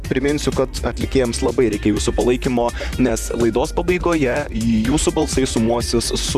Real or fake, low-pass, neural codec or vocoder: fake; 14.4 kHz; vocoder, 44.1 kHz, 128 mel bands, Pupu-Vocoder